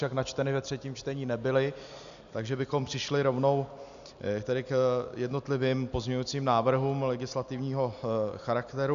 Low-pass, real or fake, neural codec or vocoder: 7.2 kHz; real; none